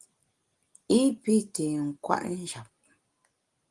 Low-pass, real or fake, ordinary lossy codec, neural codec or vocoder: 10.8 kHz; real; Opus, 24 kbps; none